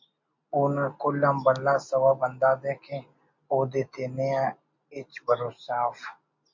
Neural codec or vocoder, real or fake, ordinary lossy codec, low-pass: none; real; MP3, 48 kbps; 7.2 kHz